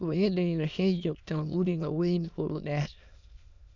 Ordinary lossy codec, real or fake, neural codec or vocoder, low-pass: none; fake; autoencoder, 22.05 kHz, a latent of 192 numbers a frame, VITS, trained on many speakers; 7.2 kHz